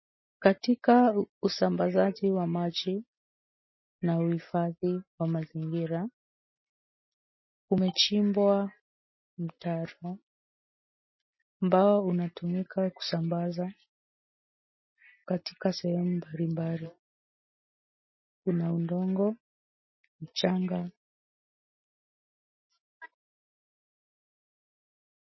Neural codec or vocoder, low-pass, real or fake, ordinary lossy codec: none; 7.2 kHz; real; MP3, 24 kbps